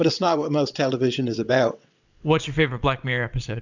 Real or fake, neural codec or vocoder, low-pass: fake; vocoder, 22.05 kHz, 80 mel bands, WaveNeXt; 7.2 kHz